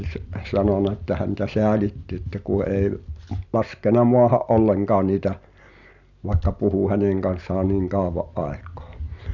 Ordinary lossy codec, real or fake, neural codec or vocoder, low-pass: none; real; none; 7.2 kHz